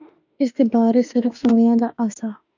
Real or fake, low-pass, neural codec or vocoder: fake; 7.2 kHz; autoencoder, 48 kHz, 32 numbers a frame, DAC-VAE, trained on Japanese speech